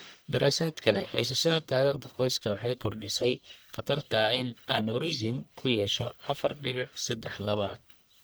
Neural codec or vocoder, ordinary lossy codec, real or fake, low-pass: codec, 44.1 kHz, 1.7 kbps, Pupu-Codec; none; fake; none